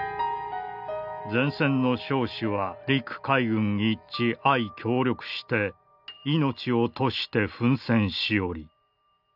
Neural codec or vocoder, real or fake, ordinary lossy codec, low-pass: none; real; none; 5.4 kHz